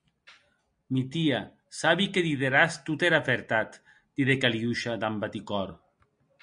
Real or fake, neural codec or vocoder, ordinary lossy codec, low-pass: real; none; MP3, 64 kbps; 9.9 kHz